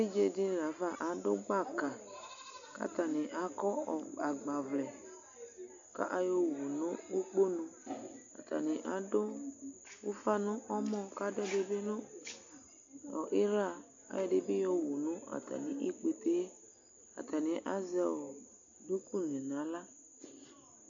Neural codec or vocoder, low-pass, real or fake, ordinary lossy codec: none; 7.2 kHz; real; AAC, 48 kbps